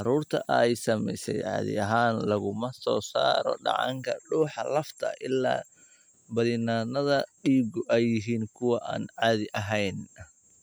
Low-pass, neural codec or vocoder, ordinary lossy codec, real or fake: none; none; none; real